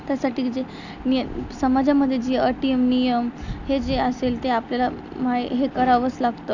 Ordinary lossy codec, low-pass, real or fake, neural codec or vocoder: none; 7.2 kHz; real; none